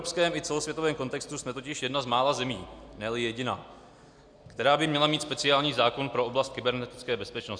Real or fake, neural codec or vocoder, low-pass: real; none; 9.9 kHz